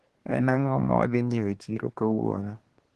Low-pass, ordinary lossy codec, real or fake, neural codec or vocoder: 10.8 kHz; Opus, 16 kbps; fake; codec, 24 kHz, 1 kbps, SNAC